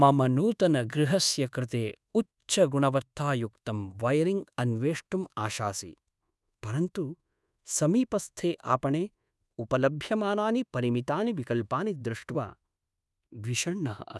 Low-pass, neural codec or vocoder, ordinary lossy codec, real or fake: none; codec, 24 kHz, 1.2 kbps, DualCodec; none; fake